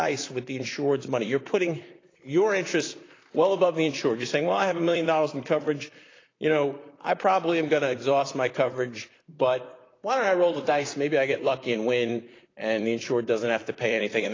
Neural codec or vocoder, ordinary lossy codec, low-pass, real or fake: vocoder, 44.1 kHz, 128 mel bands, Pupu-Vocoder; AAC, 32 kbps; 7.2 kHz; fake